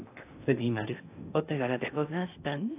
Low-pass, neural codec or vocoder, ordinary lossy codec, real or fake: 3.6 kHz; codec, 16 kHz in and 24 kHz out, 0.6 kbps, FocalCodec, streaming, 2048 codes; AAC, 24 kbps; fake